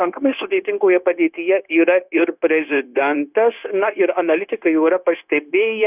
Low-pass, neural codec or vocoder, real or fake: 3.6 kHz; codec, 16 kHz, 0.9 kbps, LongCat-Audio-Codec; fake